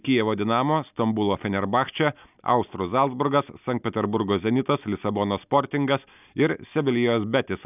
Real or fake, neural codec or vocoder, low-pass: real; none; 3.6 kHz